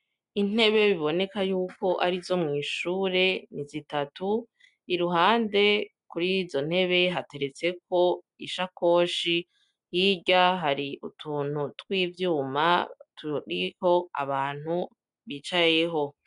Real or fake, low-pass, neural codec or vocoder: real; 10.8 kHz; none